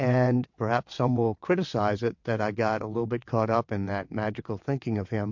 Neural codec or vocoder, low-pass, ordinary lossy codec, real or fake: vocoder, 22.05 kHz, 80 mel bands, WaveNeXt; 7.2 kHz; MP3, 48 kbps; fake